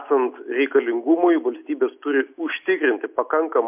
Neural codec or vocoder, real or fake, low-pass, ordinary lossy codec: none; real; 3.6 kHz; MP3, 32 kbps